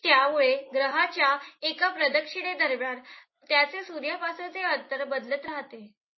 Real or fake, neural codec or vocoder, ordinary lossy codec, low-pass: real; none; MP3, 24 kbps; 7.2 kHz